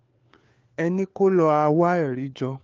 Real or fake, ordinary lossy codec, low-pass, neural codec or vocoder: fake; Opus, 24 kbps; 7.2 kHz; codec, 16 kHz, 4 kbps, FunCodec, trained on LibriTTS, 50 frames a second